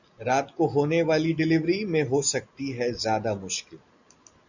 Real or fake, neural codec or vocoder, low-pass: real; none; 7.2 kHz